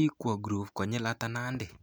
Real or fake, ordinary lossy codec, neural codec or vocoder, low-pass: real; none; none; none